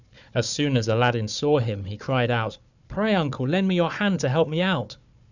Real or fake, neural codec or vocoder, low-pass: fake; codec, 16 kHz, 4 kbps, FunCodec, trained on Chinese and English, 50 frames a second; 7.2 kHz